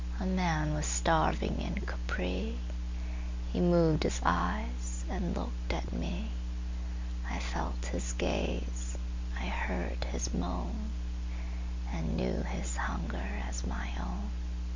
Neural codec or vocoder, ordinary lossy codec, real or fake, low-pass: none; MP3, 64 kbps; real; 7.2 kHz